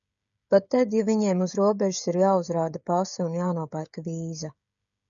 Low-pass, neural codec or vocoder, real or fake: 7.2 kHz; codec, 16 kHz, 16 kbps, FreqCodec, smaller model; fake